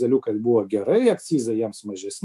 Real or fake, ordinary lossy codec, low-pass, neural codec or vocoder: fake; MP3, 96 kbps; 14.4 kHz; autoencoder, 48 kHz, 128 numbers a frame, DAC-VAE, trained on Japanese speech